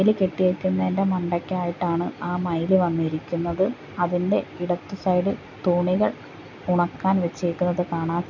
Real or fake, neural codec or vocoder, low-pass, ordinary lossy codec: real; none; 7.2 kHz; none